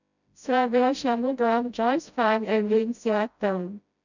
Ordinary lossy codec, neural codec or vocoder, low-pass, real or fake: none; codec, 16 kHz, 0.5 kbps, FreqCodec, smaller model; 7.2 kHz; fake